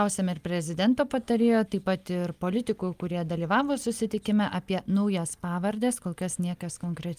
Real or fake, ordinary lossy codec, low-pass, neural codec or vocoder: real; Opus, 32 kbps; 14.4 kHz; none